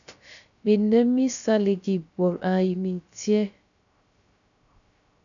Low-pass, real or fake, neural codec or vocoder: 7.2 kHz; fake; codec, 16 kHz, 0.3 kbps, FocalCodec